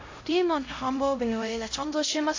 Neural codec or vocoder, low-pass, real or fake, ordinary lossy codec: codec, 16 kHz, 0.5 kbps, X-Codec, HuBERT features, trained on LibriSpeech; 7.2 kHz; fake; AAC, 32 kbps